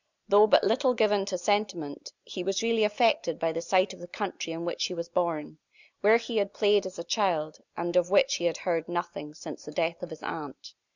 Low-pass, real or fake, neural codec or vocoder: 7.2 kHz; real; none